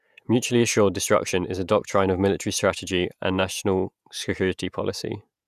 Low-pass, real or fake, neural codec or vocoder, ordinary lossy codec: 14.4 kHz; fake; vocoder, 48 kHz, 128 mel bands, Vocos; none